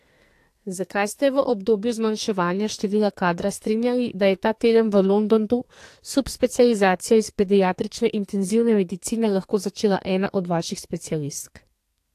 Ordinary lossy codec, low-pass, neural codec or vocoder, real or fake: AAC, 64 kbps; 14.4 kHz; codec, 44.1 kHz, 2.6 kbps, SNAC; fake